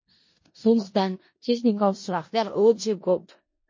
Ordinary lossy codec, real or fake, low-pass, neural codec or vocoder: MP3, 32 kbps; fake; 7.2 kHz; codec, 16 kHz in and 24 kHz out, 0.4 kbps, LongCat-Audio-Codec, four codebook decoder